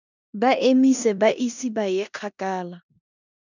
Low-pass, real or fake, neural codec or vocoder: 7.2 kHz; fake; codec, 16 kHz in and 24 kHz out, 0.9 kbps, LongCat-Audio-Codec, four codebook decoder